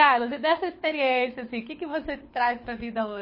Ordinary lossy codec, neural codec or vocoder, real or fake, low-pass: MP3, 24 kbps; codec, 24 kHz, 6 kbps, HILCodec; fake; 5.4 kHz